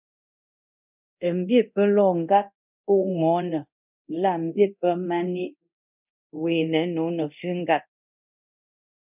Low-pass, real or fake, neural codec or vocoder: 3.6 kHz; fake; codec, 24 kHz, 0.9 kbps, DualCodec